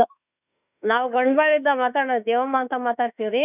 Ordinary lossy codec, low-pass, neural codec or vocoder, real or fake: none; 3.6 kHz; autoencoder, 48 kHz, 32 numbers a frame, DAC-VAE, trained on Japanese speech; fake